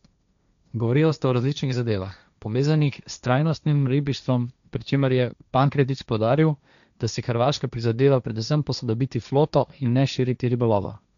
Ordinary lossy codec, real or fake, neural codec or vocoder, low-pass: none; fake; codec, 16 kHz, 1.1 kbps, Voila-Tokenizer; 7.2 kHz